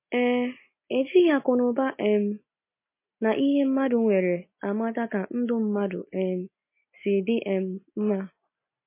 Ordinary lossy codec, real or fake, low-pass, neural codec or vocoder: MP3, 24 kbps; real; 3.6 kHz; none